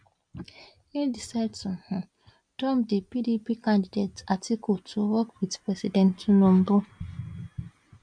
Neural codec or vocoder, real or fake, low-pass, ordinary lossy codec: none; real; 9.9 kHz; MP3, 96 kbps